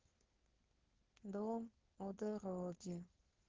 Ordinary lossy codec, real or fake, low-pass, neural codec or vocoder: Opus, 16 kbps; fake; 7.2 kHz; codec, 16 kHz, 4.8 kbps, FACodec